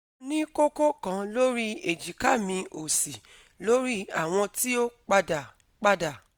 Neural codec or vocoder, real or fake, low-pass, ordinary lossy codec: none; real; none; none